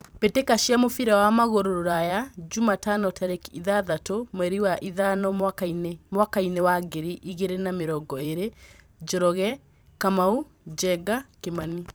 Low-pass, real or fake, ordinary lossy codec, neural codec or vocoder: none; fake; none; vocoder, 44.1 kHz, 128 mel bands every 512 samples, BigVGAN v2